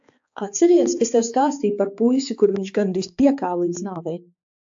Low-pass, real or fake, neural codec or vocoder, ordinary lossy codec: 7.2 kHz; fake; codec, 16 kHz, 4 kbps, X-Codec, HuBERT features, trained on balanced general audio; AAC, 64 kbps